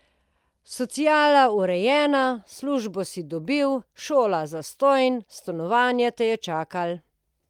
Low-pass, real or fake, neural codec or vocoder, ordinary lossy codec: 19.8 kHz; real; none; Opus, 32 kbps